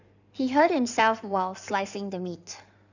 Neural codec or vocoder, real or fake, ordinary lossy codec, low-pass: codec, 16 kHz in and 24 kHz out, 2.2 kbps, FireRedTTS-2 codec; fake; none; 7.2 kHz